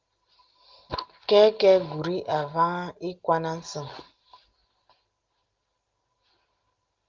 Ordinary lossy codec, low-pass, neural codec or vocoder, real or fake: Opus, 32 kbps; 7.2 kHz; none; real